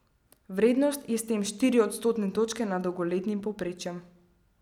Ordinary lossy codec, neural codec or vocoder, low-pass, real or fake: none; none; 19.8 kHz; real